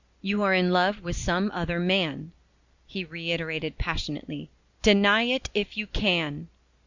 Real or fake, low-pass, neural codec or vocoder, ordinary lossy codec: real; 7.2 kHz; none; Opus, 64 kbps